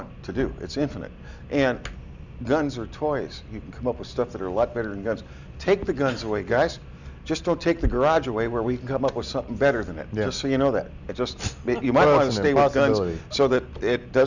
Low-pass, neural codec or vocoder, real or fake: 7.2 kHz; none; real